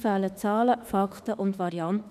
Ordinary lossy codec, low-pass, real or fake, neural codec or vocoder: none; 14.4 kHz; fake; autoencoder, 48 kHz, 32 numbers a frame, DAC-VAE, trained on Japanese speech